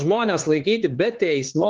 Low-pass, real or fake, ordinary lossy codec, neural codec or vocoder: 7.2 kHz; fake; Opus, 16 kbps; codec, 16 kHz, 4 kbps, X-Codec, HuBERT features, trained on LibriSpeech